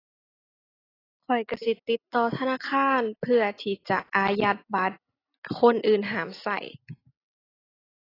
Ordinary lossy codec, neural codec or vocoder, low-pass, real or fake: AAC, 24 kbps; none; 5.4 kHz; real